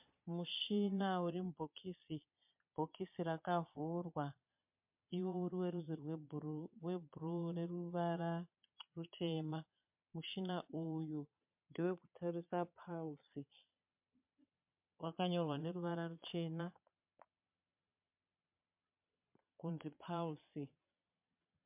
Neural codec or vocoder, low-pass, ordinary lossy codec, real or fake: vocoder, 22.05 kHz, 80 mel bands, Vocos; 3.6 kHz; MP3, 32 kbps; fake